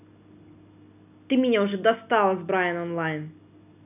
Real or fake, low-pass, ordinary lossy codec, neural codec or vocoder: real; 3.6 kHz; none; none